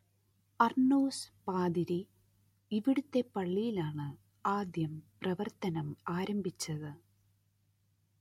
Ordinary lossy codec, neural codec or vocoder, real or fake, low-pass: MP3, 64 kbps; none; real; 19.8 kHz